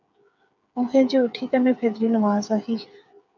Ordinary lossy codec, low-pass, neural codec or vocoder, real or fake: MP3, 64 kbps; 7.2 kHz; codec, 16 kHz, 8 kbps, FreqCodec, smaller model; fake